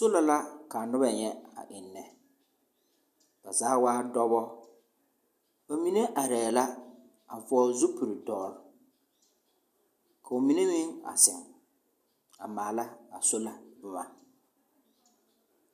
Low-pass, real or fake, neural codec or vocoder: 14.4 kHz; real; none